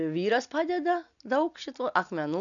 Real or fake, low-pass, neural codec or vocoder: real; 7.2 kHz; none